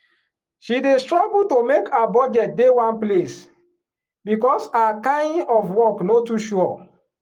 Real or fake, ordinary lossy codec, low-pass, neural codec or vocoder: fake; Opus, 32 kbps; 19.8 kHz; codec, 44.1 kHz, 7.8 kbps, Pupu-Codec